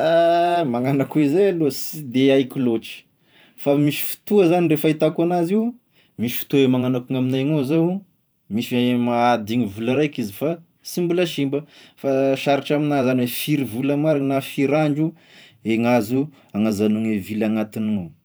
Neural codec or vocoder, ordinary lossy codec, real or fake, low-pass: vocoder, 44.1 kHz, 128 mel bands every 512 samples, BigVGAN v2; none; fake; none